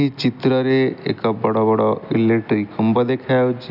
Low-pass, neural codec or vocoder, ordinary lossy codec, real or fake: 5.4 kHz; none; none; real